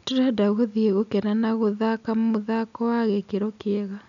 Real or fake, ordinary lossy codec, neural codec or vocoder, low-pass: real; none; none; 7.2 kHz